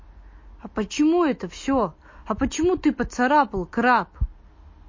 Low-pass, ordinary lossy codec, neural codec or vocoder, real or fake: 7.2 kHz; MP3, 32 kbps; none; real